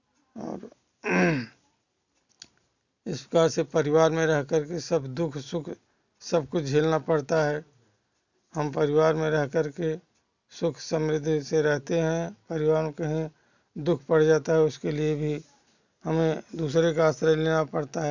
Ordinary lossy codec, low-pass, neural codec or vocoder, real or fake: none; 7.2 kHz; none; real